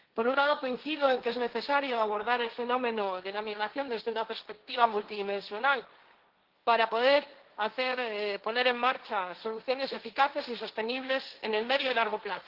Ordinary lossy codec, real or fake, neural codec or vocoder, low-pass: Opus, 16 kbps; fake; codec, 16 kHz, 1.1 kbps, Voila-Tokenizer; 5.4 kHz